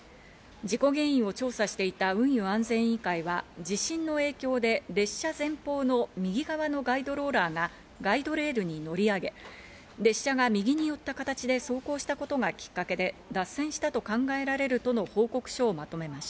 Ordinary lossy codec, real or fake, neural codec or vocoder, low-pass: none; real; none; none